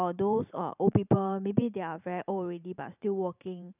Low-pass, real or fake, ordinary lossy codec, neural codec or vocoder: 3.6 kHz; fake; none; vocoder, 44.1 kHz, 128 mel bands every 512 samples, BigVGAN v2